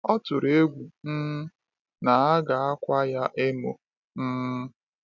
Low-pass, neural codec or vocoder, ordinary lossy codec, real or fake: 7.2 kHz; none; none; real